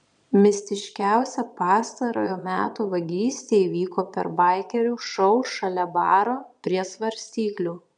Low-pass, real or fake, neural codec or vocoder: 9.9 kHz; fake; vocoder, 22.05 kHz, 80 mel bands, Vocos